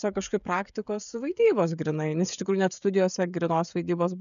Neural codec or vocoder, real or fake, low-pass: codec, 16 kHz, 16 kbps, FreqCodec, smaller model; fake; 7.2 kHz